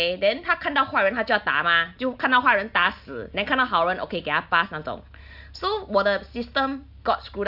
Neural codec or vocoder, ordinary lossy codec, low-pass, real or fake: none; AAC, 48 kbps; 5.4 kHz; real